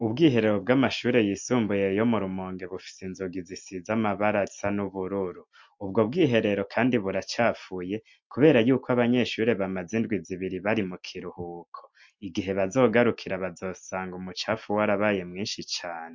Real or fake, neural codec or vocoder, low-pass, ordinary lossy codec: real; none; 7.2 kHz; MP3, 48 kbps